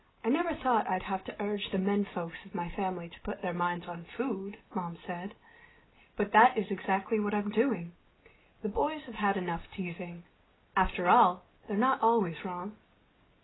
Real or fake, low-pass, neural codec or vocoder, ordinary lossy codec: real; 7.2 kHz; none; AAC, 16 kbps